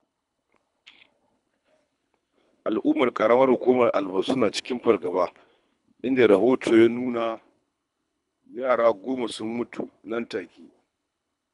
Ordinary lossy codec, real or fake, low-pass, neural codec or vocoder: none; fake; 10.8 kHz; codec, 24 kHz, 3 kbps, HILCodec